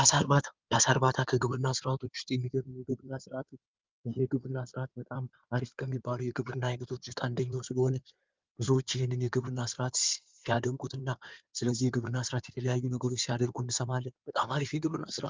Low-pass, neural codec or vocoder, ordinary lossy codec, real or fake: 7.2 kHz; codec, 16 kHz in and 24 kHz out, 2.2 kbps, FireRedTTS-2 codec; Opus, 24 kbps; fake